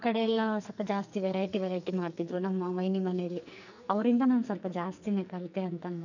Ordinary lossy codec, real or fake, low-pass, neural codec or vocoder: none; fake; 7.2 kHz; codec, 44.1 kHz, 2.6 kbps, SNAC